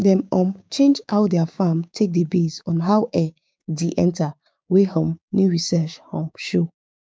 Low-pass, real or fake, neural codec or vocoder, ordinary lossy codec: none; fake; codec, 16 kHz, 6 kbps, DAC; none